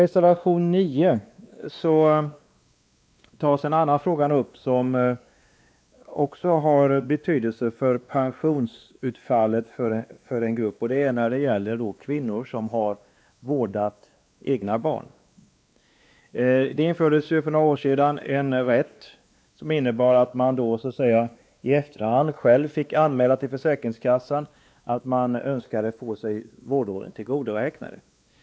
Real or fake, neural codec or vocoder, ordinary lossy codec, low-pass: fake; codec, 16 kHz, 2 kbps, X-Codec, WavLM features, trained on Multilingual LibriSpeech; none; none